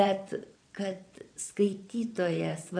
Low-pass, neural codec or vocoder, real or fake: 9.9 kHz; vocoder, 22.05 kHz, 80 mel bands, WaveNeXt; fake